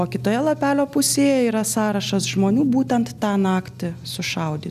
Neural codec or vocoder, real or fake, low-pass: none; real; 14.4 kHz